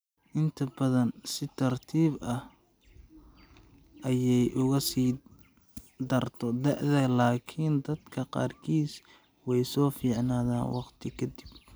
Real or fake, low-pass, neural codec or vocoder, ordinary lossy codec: real; none; none; none